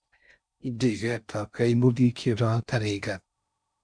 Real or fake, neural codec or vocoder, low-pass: fake; codec, 16 kHz in and 24 kHz out, 0.6 kbps, FocalCodec, streaming, 4096 codes; 9.9 kHz